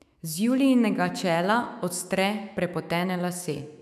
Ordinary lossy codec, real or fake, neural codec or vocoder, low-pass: none; fake; autoencoder, 48 kHz, 128 numbers a frame, DAC-VAE, trained on Japanese speech; 14.4 kHz